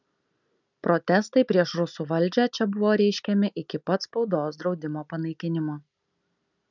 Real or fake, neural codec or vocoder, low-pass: real; none; 7.2 kHz